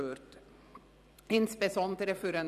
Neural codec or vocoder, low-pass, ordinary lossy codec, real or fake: vocoder, 44.1 kHz, 128 mel bands every 256 samples, BigVGAN v2; 14.4 kHz; none; fake